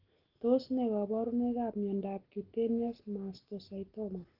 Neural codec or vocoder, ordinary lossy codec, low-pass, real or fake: none; Opus, 16 kbps; 5.4 kHz; real